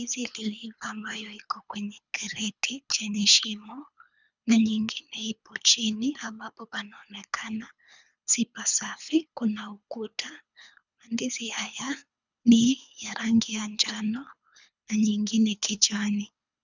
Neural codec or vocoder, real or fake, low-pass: codec, 24 kHz, 3 kbps, HILCodec; fake; 7.2 kHz